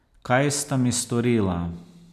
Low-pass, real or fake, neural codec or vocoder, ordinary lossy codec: 14.4 kHz; real; none; none